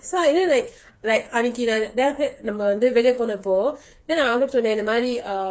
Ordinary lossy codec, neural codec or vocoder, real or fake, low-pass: none; codec, 16 kHz, 4 kbps, FreqCodec, smaller model; fake; none